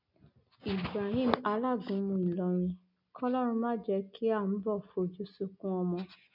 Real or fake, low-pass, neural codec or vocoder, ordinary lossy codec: real; 5.4 kHz; none; none